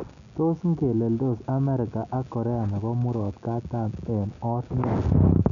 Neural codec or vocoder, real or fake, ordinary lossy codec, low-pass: none; real; none; 7.2 kHz